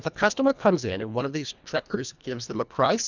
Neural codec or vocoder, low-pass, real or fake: codec, 24 kHz, 1.5 kbps, HILCodec; 7.2 kHz; fake